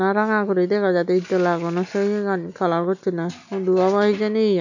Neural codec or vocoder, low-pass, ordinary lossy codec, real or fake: none; 7.2 kHz; none; real